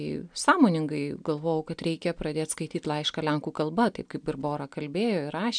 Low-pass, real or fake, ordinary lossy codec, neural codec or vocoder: 9.9 kHz; real; MP3, 96 kbps; none